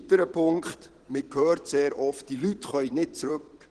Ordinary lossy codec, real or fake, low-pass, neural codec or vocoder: Opus, 16 kbps; fake; 9.9 kHz; vocoder, 22.05 kHz, 80 mel bands, Vocos